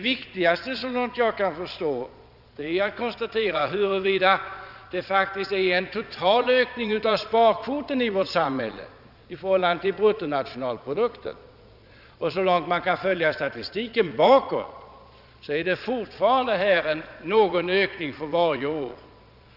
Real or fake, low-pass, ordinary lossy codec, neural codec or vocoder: fake; 5.4 kHz; none; vocoder, 22.05 kHz, 80 mel bands, Vocos